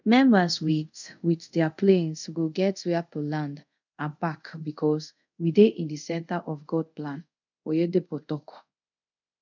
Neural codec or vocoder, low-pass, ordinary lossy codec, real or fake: codec, 24 kHz, 0.5 kbps, DualCodec; 7.2 kHz; none; fake